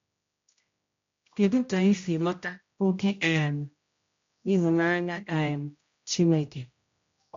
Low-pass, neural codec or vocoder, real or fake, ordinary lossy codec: 7.2 kHz; codec, 16 kHz, 0.5 kbps, X-Codec, HuBERT features, trained on general audio; fake; MP3, 48 kbps